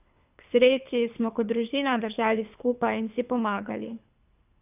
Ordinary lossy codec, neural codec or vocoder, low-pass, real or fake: none; codec, 24 kHz, 3 kbps, HILCodec; 3.6 kHz; fake